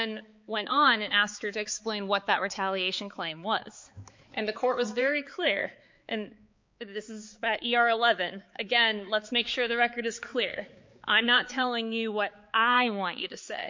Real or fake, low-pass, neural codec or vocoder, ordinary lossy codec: fake; 7.2 kHz; codec, 16 kHz, 4 kbps, X-Codec, HuBERT features, trained on balanced general audio; MP3, 48 kbps